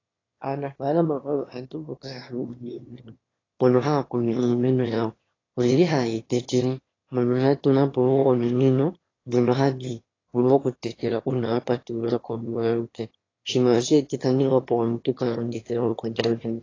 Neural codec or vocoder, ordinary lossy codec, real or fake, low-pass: autoencoder, 22.05 kHz, a latent of 192 numbers a frame, VITS, trained on one speaker; AAC, 32 kbps; fake; 7.2 kHz